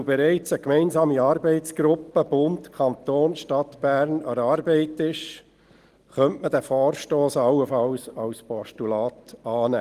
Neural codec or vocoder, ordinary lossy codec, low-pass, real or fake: none; Opus, 24 kbps; 14.4 kHz; real